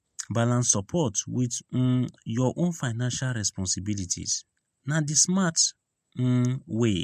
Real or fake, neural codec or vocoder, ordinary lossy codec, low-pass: real; none; MP3, 64 kbps; 14.4 kHz